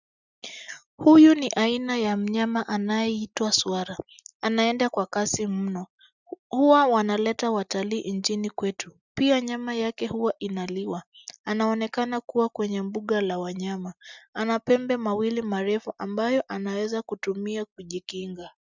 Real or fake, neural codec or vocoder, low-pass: real; none; 7.2 kHz